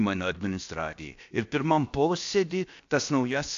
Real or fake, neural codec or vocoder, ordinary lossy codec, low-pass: fake; codec, 16 kHz, about 1 kbps, DyCAST, with the encoder's durations; AAC, 64 kbps; 7.2 kHz